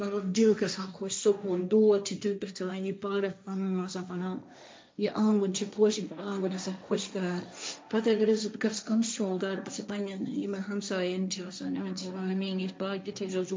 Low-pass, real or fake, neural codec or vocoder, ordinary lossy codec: none; fake; codec, 16 kHz, 1.1 kbps, Voila-Tokenizer; none